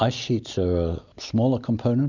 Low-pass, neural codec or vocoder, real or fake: 7.2 kHz; none; real